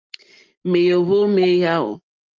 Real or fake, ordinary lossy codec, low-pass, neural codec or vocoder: real; Opus, 24 kbps; 7.2 kHz; none